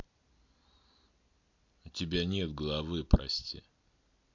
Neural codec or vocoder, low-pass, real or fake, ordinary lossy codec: none; 7.2 kHz; real; MP3, 64 kbps